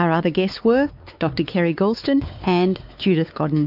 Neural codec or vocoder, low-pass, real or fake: codec, 16 kHz, 4 kbps, X-Codec, WavLM features, trained on Multilingual LibriSpeech; 5.4 kHz; fake